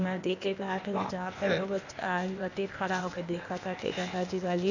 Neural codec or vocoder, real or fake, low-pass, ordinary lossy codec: codec, 16 kHz, 0.8 kbps, ZipCodec; fake; 7.2 kHz; none